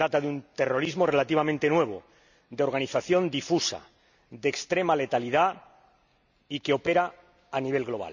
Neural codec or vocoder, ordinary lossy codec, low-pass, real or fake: none; none; 7.2 kHz; real